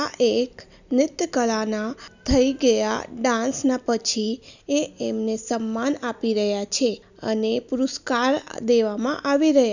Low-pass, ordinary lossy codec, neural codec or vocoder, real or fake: 7.2 kHz; none; none; real